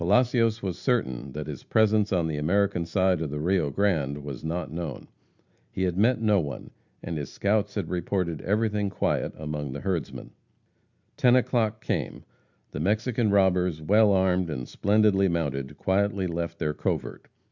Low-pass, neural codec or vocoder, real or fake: 7.2 kHz; none; real